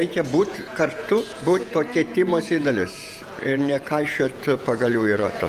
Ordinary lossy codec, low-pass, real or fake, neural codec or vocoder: Opus, 32 kbps; 14.4 kHz; fake; vocoder, 48 kHz, 128 mel bands, Vocos